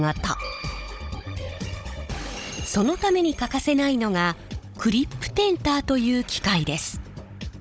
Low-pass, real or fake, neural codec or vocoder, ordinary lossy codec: none; fake; codec, 16 kHz, 16 kbps, FunCodec, trained on Chinese and English, 50 frames a second; none